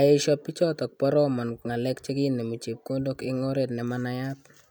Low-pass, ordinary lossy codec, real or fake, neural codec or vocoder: none; none; real; none